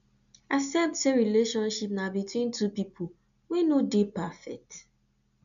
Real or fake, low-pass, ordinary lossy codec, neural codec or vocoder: real; 7.2 kHz; none; none